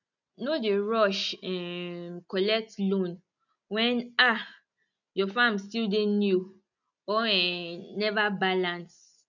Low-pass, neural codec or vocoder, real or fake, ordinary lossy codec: 7.2 kHz; none; real; none